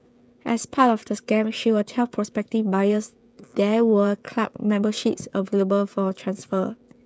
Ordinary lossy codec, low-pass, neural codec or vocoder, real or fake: none; none; codec, 16 kHz, 16 kbps, FreqCodec, smaller model; fake